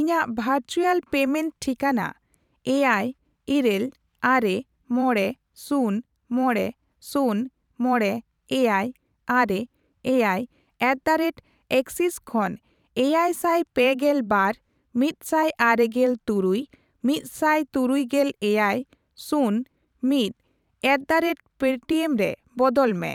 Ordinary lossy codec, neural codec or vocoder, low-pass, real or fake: none; vocoder, 44.1 kHz, 128 mel bands every 512 samples, BigVGAN v2; 19.8 kHz; fake